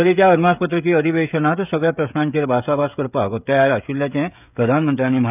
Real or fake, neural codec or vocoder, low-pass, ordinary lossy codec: fake; codec, 16 kHz, 16 kbps, FreqCodec, smaller model; 3.6 kHz; none